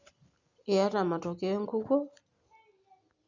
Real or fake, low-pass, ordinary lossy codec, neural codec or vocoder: real; 7.2 kHz; Opus, 64 kbps; none